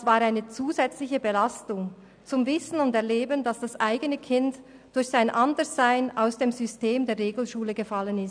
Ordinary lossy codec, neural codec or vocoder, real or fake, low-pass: none; none; real; 9.9 kHz